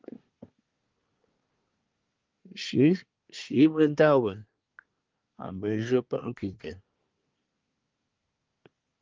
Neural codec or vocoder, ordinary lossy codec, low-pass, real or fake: codec, 24 kHz, 1 kbps, SNAC; Opus, 32 kbps; 7.2 kHz; fake